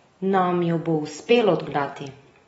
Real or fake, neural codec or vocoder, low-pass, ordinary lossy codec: real; none; 10.8 kHz; AAC, 24 kbps